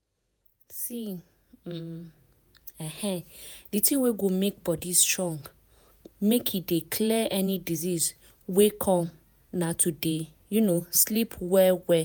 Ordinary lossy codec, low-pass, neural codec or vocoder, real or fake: none; none; vocoder, 48 kHz, 128 mel bands, Vocos; fake